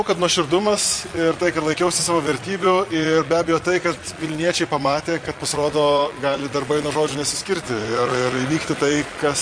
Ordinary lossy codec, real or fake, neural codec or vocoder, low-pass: MP3, 48 kbps; fake; vocoder, 22.05 kHz, 80 mel bands, Vocos; 9.9 kHz